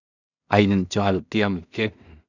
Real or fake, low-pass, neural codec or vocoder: fake; 7.2 kHz; codec, 16 kHz in and 24 kHz out, 0.4 kbps, LongCat-Audio-Codec, two codebook decoder